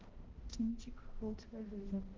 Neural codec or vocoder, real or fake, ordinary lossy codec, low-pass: codec, 16 kHz, 0.5 kbps, X-Codec, HuBERT features, trained on balanced general audio; fake; Opus, 16 kbps; 7.2 kHz